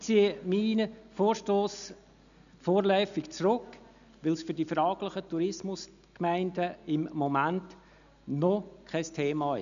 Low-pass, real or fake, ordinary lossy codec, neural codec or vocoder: 7.2 kHz; real; none; none